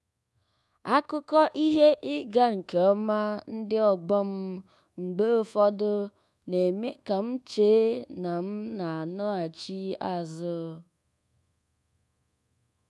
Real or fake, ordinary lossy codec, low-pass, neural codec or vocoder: fake; none; none; codec, 24 kHz, 1.2 kbps, DualCodec